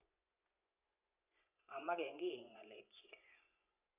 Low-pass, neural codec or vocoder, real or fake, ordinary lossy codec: 3.6 kHz; vocoder, 24 kHz, 100 mel bands, Vocos; fake; none